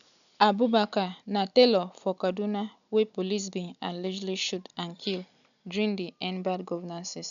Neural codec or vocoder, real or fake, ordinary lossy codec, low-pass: none; real; none; 7.2 kHz